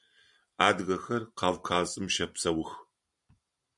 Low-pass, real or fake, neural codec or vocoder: 10.8 kHz; real; none